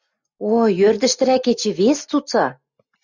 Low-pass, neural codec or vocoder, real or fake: 7.2 kHz; none; real